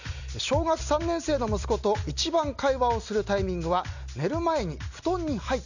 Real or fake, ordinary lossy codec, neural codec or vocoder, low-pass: real; none; none; 7.2 kHz